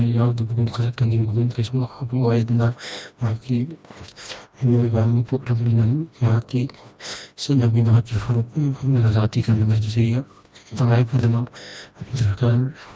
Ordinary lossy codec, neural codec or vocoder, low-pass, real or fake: none; codec, 16 kHz, 1 kbps, FreqCodec, smaller model; none; fake